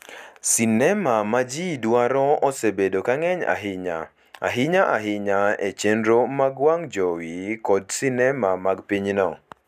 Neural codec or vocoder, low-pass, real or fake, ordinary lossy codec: none; 14.4 kHz; real; none